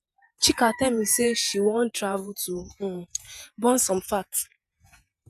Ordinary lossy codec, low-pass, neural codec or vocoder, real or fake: none; 14.4 kHz; vocoder, 48 kHz, 128 mel bands, Vocos; fake